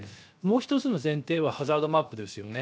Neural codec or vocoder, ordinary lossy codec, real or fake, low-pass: codec, 16 kHz, 0.7 kbps, FocalCodec; none; fake; none